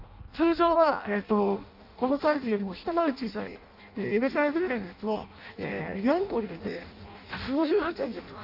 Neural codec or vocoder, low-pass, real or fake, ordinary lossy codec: codec, 16 kHz in and 24 kHz out, 0.6 kbps, FireRedTTS-2 codec; 5.4 kHz; fake; none